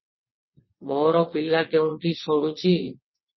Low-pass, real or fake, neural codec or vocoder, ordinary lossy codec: 7.2 kHz; fake; vocoder, 22.05 kHz, 80 mel bands, WaveNeXt; MP3, 24 kbps